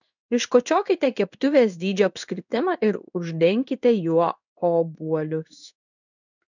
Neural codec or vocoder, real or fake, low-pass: codec, 16 kHz in and 24 kHz out, 1 kbps, XY-Tokenizer; fake; 7.2 kHz